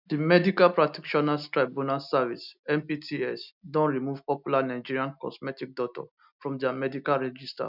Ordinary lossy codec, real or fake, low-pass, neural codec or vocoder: none; real; 5.4 kHz; none